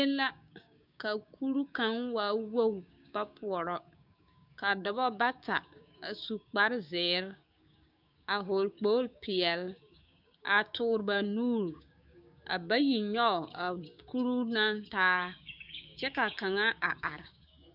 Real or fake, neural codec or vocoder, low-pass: fake; codec, 16 kHz, 6 kbps, DAC; 5.4 kHz